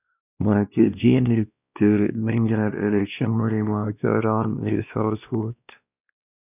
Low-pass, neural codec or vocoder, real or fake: 3.6 kHz; codec, 16 kHz, 1 kbps, X-Codec, WavLM features, trained on Multilingual LibriSpeech; fake